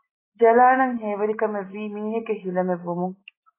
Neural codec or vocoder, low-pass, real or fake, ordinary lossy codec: none; 3.6 kHz; real; AAC, 16 kbps